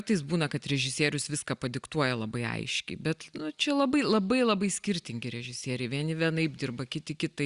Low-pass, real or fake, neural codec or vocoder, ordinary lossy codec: 10.8 kHz; real; none; Opus, 64 kbps